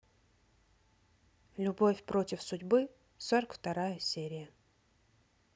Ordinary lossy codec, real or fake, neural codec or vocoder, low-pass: none; real; none; none